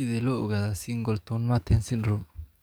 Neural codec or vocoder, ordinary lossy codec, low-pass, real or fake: codec, 44.1 kHz, 7.8 kbps, DAC; none; none; fake